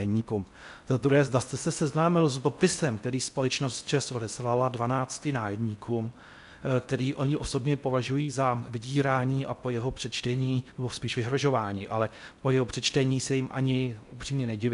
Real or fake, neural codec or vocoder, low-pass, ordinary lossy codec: fake; codec, 16 kHz in and 24 kHz out, 0.6 kbps, FocalCodec, streaming, 4096 codes; 10.8 kHz; MP3, 96 kbps